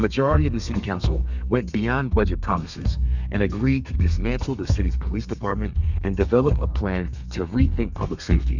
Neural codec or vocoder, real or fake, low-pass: codec, 32 kHz, 1.9 kbps, SNAC; fake; 7.2 kHz